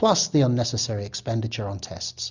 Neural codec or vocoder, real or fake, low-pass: none; real; 7.2 kHz